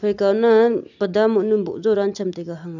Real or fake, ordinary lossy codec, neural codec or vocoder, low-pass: real; none; none; 7.2 kHz